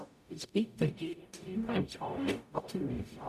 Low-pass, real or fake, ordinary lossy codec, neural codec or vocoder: 14.4 kHz; fake; none; codec, 44.1 kHz, 0.9 kbps, DAC